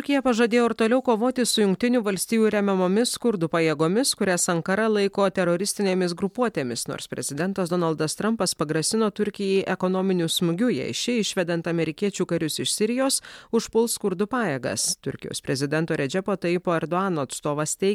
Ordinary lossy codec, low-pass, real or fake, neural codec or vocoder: MP3, 96 kbps; 19.8 kHz; real; none